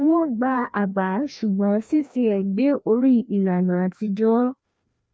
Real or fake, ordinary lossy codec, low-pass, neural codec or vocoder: fake; none; none; codec, 16 kHz, 1 kbps, FreqCodec, larger model